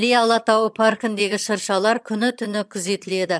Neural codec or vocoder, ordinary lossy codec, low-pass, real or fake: vocoder, 22.05 kHz, 80 mel bands, HiFi-GAN; none; none; fake